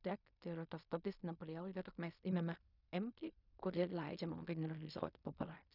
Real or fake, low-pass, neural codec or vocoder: fake; 5.4 kHz; codec, 16 kHz in and 24 kHz out, 0.4 kbps, LongCat-Audio-Codec, fine tuned four codebook decoder